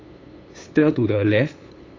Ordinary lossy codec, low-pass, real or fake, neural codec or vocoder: AAC, 32 kbps; 7.2 kHz; fake; codec, 16 kHz, 8 kbps, FunCodec, trained on LibriTTS, 25 frames a second